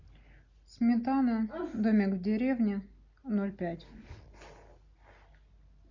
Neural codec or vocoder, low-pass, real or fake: none; 7.2 kHz; real